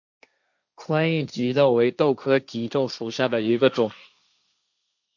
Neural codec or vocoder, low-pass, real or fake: codec, 16 kHz, 1.1 kbps, Voila-Tokenizer; 7.2 kHz; fake